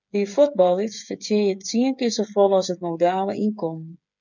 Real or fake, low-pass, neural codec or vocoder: fake; 7.2 kHz; codec, 16 kHz, 8 kbps, FreqCodec, smaller model